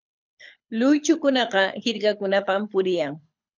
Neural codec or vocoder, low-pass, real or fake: codec, 24 kHz, 6 kbps, HILCodec; 7.2 kHz; fake